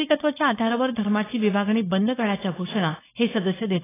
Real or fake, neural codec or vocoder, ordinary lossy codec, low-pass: fake; codec, 16 kHz, 4.8 kbps, FACodec; AAC, 16 kbps; 3.6 kHz